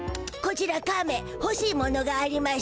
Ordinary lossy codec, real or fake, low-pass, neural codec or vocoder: none; real; none; none